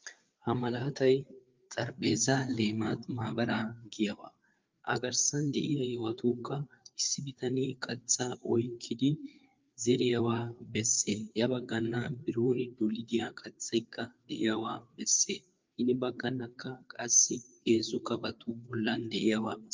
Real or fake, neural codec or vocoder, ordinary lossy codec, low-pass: fake; codec, 16 kHz, 4 kbps, FreqCodec, larger model; Opus, 24 kbps; 7.2 kHz